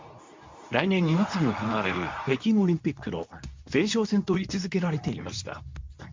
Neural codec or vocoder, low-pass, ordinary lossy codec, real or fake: codec, 24 kHz, 0.9 kbps, WavTokenizer, medium speech release version 2; 7.2 kHz; MP3, 64 kbps; fake